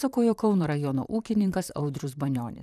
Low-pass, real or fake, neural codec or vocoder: 14.4 kHz; fake; vocoder, 44.1 kHz, 128 mel bands, Pupu-Vocoder